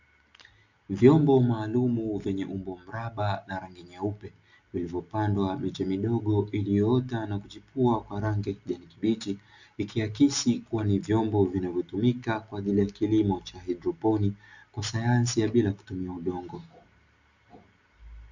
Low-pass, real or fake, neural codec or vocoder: 7.2 kHz; real; none